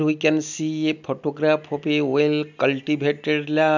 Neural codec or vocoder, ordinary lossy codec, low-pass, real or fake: none; none; 7.2 kHz; real